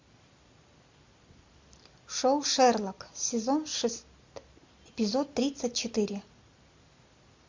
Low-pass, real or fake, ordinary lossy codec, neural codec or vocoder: 7.2 kHz; real; MP3, 48 kbps; none